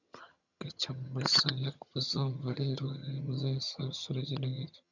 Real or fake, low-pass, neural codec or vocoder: fake; 7.2 kHz; vocoder, 22.05 kHz, 80 mel bands, HiFi-GAN